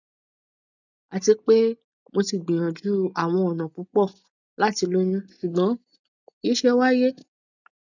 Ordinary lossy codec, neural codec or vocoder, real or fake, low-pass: none; none; real; 7.2 kHz